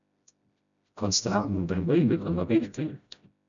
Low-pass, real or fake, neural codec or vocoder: 7.2 kHz; fake; codec, 16 kHz, 0.5 kbps, FreqCodec, smaller model